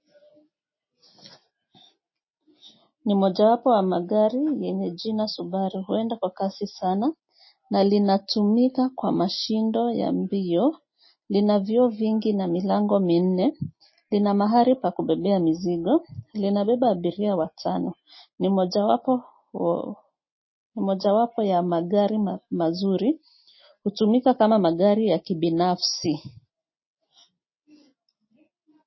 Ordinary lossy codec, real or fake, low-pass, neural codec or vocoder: MP3, 24 kbps; real; 7.2 kHz; none